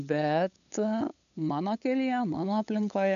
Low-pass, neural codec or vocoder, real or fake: 7.2 kHz; codec, 16 kHz, 8 kbps, FunCodec, trained on LibriTTS, 25 frames a second; fake